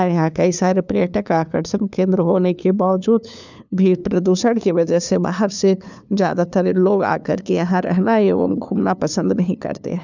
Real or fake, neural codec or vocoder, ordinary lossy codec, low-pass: fake; codec, 16 kHz, 2 kbps, FunCodec, trained on LibriTTS, 25 frames a second; none; 7.2 kHz